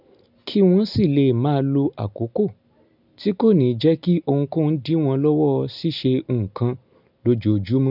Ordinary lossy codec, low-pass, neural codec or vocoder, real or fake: none; 5.4 kHz; none; real